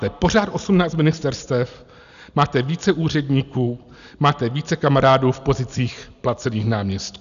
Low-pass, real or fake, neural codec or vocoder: 7.2 kHz; real; none